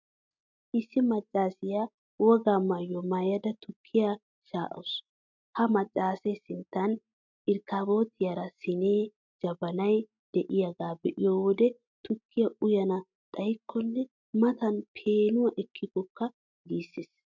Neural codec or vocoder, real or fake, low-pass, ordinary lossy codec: none; real; 7.2 kHz; MP3, 64 kbps